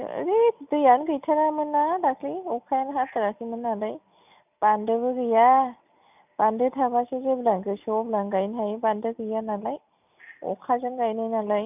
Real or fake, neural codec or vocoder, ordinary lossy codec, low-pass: real; none; none; 3.6 kHz